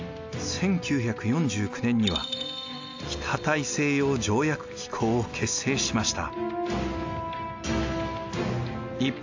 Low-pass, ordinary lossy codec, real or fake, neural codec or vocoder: 7.2 kHz; AAC, 48 kbps; real; none